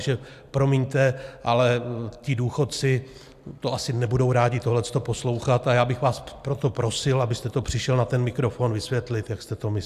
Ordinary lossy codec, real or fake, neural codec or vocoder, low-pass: AAC, 96 kbps; real; none; 14.4 kHz